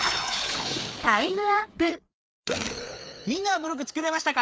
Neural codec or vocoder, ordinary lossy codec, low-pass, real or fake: codec, 16 kHz, 4 kbps, FreqCodec, larger model; none; none; fake